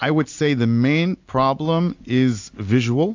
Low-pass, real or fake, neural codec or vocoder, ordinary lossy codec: 7.2 kHz; real; none; AAC, 48 kbps